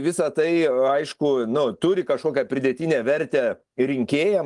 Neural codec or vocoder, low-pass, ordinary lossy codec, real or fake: none; 10.8 kHz; Opus, 32 kbps; real